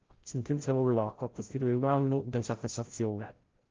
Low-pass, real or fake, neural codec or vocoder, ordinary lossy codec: 7.2 kHz; fake; codec, 16 kHz, 0.5 kbps, FreqCodec, larger model; Opus, 16 kbps